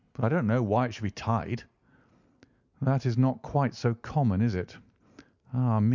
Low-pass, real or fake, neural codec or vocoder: 7.2 kHz; real; none